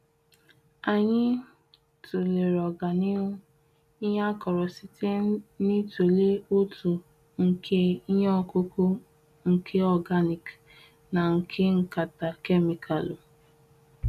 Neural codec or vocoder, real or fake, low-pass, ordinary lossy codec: none; real; 14.4 kHz; none